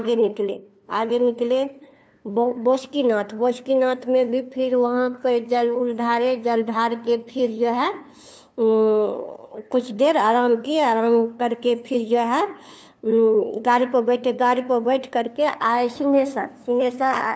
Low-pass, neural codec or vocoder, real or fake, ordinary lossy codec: none; codec, 16 kHz, 2 kbps, FunCodec, trained on LibriTTS, 25 frames a second; fake; none